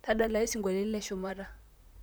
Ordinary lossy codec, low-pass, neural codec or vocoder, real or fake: none; none; vocoder, 44.1 kHz, 128 mel bands, Pupu-Vocoder; fake